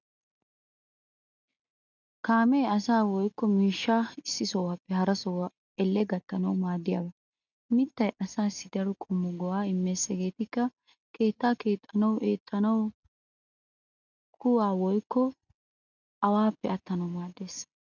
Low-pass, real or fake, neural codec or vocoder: 7.2 kHz; real; none